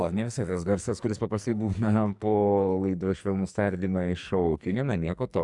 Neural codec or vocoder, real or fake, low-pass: codec, 44.1 kHz, 2.6 kbps, SNAC; fake; 10.8 kHz